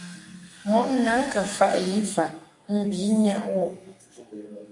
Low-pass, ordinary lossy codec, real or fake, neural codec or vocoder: 10.8 kHz; MP3, 48 kbps; fake; codec, 32 kHz, 1.9 kbps, SNAC